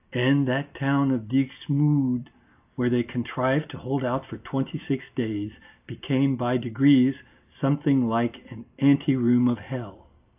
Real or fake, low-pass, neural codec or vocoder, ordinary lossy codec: real; 3.6 kHz; none; AAC, 32 kbps